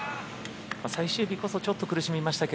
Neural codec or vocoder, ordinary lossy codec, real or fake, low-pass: none; none; real; none